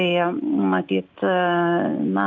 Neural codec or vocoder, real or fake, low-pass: none; real; 7.2 kHz